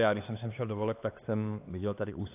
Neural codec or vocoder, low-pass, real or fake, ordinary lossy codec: codec, 16 kHz, 2 kbps, X-Codec, HuBERT features, trained on LibriSpeech; 3.6 kHz; fake; AAC, 24 kbps